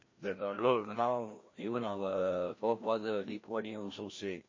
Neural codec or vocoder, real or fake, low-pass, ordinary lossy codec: codec, 16 kHz, 1 kbps, FreqCodec, larger model; fake; 7.2 kHz; MP3, 32 kbps